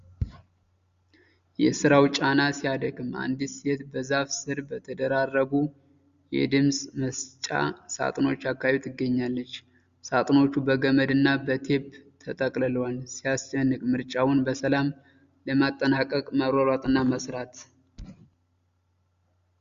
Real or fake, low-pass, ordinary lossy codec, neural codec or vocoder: real; 7.2 kHz; Opus, 64 kbps; none